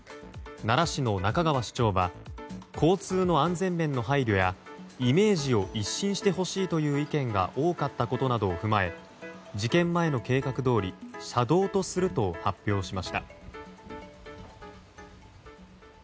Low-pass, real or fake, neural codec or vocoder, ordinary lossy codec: none; real; none; none